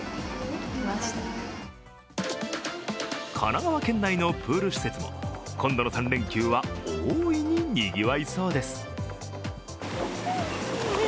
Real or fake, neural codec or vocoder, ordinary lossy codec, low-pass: real; none; none; none